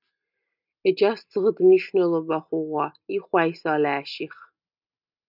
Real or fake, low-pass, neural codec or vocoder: real; 5.4 kHz; none